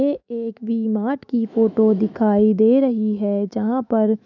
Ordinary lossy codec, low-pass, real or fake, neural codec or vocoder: none; 7.2 kHz; fake; autoencoder, 48 kHz, 128 numbers a frame, DAC-VAE, trained on Japanese speech